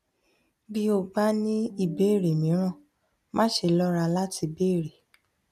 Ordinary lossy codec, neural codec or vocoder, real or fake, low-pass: none; none; real; 14.4 kHz